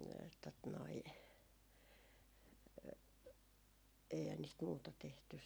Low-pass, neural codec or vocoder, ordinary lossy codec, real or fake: none; none; none; real